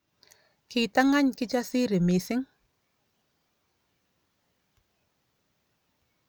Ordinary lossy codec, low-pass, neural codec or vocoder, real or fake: none; none; none; real